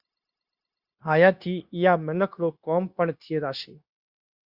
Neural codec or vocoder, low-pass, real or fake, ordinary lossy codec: codec, 16 kHz, 0.9 kbps, LongCat-Audio-Codec; 5.4 kHz; fake; Opus, 64 kbps